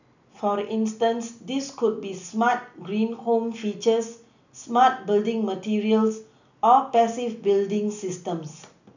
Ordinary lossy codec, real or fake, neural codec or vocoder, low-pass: none; real; none; 7.2 kHz